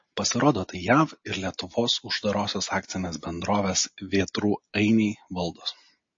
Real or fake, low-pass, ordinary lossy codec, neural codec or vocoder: real; 7.2 kHz; MP3, 32 kbps; none